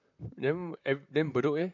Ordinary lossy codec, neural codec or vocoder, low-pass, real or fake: none; vocoder, 44.1 kHz, 128 mel bands, Pupu-Vocoder; 7.2 kHz; fake